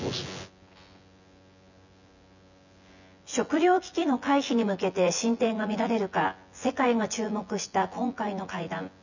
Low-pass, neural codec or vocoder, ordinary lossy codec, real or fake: 7.2 kHz; vocoder, 24 kHz, 100 mel bands, Vocos; none; fake